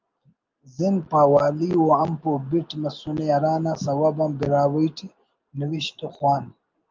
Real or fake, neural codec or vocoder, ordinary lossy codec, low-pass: real; none; Opus, 32 kbps; 7.2 kHz